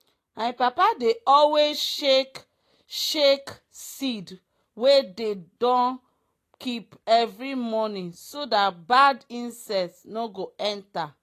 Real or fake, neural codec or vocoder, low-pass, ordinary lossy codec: real; none; 14.4 kHz; AAC, 48 kbps